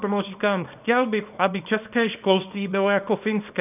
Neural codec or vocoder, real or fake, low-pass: codec, 24 kHz, 0.9 kbps, WavTokenizer, small release; fake; 3.6 kHz